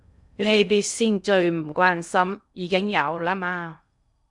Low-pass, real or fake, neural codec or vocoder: 10.8 kHz; fake; codec, 16 kHz in and 24 kHz out, 0.6 kbps, FocalCodec, streaming, 2048 codes